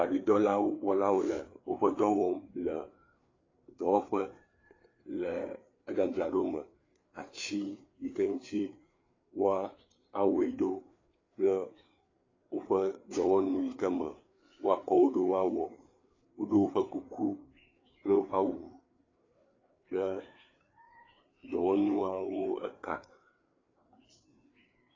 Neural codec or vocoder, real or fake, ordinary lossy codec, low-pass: codec, 16 kHz, 4 kbps, FreqCodec, larger model; fake; AAC, 32 kbps; 7.2 kHz